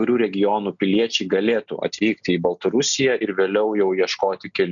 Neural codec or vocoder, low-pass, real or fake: none; 7.2 kHz; real